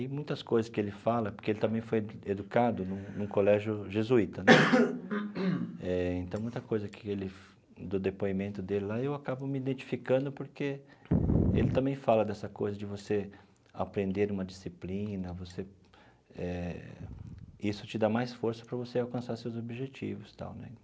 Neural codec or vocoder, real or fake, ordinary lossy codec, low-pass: none; real; none; none